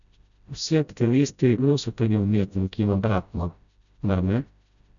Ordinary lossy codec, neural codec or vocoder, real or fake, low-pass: none; codec, 16 kHz, 0.5 kbps, FreqCodec, smaller model; fake; 7.2 kHz